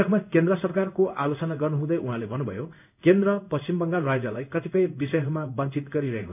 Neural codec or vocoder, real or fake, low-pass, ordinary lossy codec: codec, 16 kHz in and 24 kHz out, 1 kbps, XY-Tokenizer; fake; 3.6 kHz; none